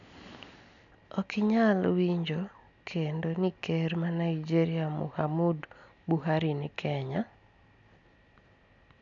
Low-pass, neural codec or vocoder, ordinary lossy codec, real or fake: 7.2 kHz; none; MP3, 96 kbps; real